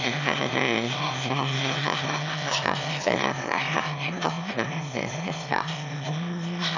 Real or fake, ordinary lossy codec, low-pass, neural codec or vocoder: fake; none; 7.2 kHz; autoencoder, 22.05 kHz, a latent of 192 numbers a frame, VITS, trained on one speaker